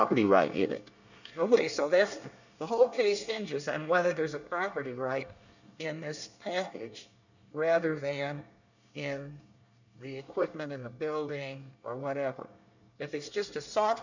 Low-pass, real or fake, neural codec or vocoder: 7.2 kHz; fake; codec, 24 kHz, 1 kbps, SNAC